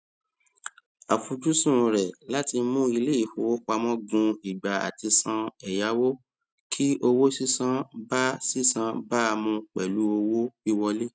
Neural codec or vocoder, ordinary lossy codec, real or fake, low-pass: none; none; real; none